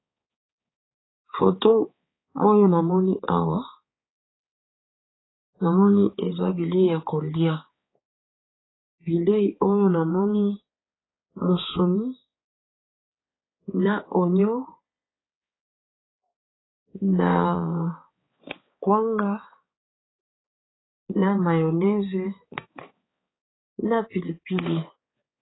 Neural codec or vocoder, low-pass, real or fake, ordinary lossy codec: codec, 16 kHz, 4 kbps, X-Codec, HuBERT features, trained on balanced general audio; 7.2 kHz; fake; AAC, 16 kbps